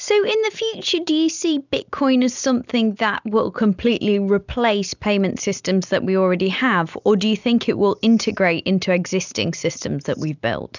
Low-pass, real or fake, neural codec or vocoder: 7.2 kHz; real; none